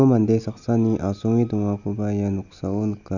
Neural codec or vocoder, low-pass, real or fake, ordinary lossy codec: none; 7.2 kHz; real; none